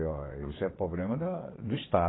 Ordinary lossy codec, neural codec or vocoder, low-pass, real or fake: AAC, 16 kbps; vocoder, 22.05 kHz, 80 mel bands, WaveNeXt; 7.2 kHz; fake